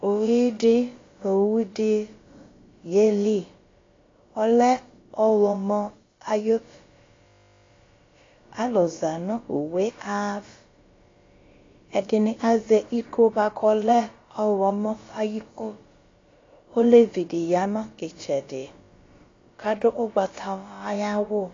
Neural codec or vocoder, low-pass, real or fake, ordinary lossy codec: codec, 16 kHz, about 1 kbps, DyCAST, with the encoder's durations; 7.2 kHz; fake; AAC, 32 kbps